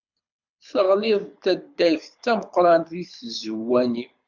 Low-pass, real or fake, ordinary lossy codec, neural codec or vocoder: 7.2 kHz; fake; MP3, 64 kbps; codec, 24 kHz, 6 kbps, HILCodec